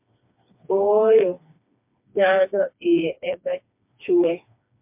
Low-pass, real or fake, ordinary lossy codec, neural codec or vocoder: 3.6 kHz; fake; MP3, 32 kbps; codec, 16 kHz, 2 kbps, FreqCodec, smaller model